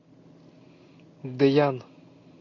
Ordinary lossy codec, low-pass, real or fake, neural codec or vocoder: AAC, 32 kbps; 7.2 kHz; real; none